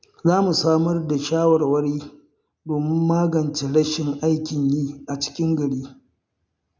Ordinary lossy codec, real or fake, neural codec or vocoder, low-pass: none; real; none; none